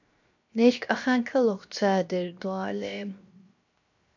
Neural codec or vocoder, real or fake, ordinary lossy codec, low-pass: codec, 16 kHz, 0.7 kbps, FocalCodec; fake; MP3, 64 kbps; 7.2 kHz